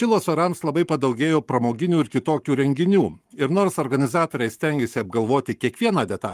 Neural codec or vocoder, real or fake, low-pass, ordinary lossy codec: codec, 44.1 kHz, 7.8 kbps, Pupu-Codec; fake; 14.4 kHz; Opus, 32 kbps